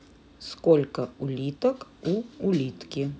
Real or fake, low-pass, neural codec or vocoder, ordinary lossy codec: real; none; none; none